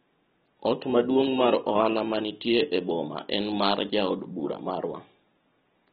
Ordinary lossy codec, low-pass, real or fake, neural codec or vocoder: AAC, 16 kbps; 10.8 kHz; real; none